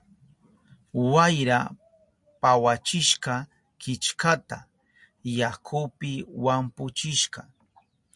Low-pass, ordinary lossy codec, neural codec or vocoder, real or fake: 10.8 kHz; MP3, 64 kbps; none; real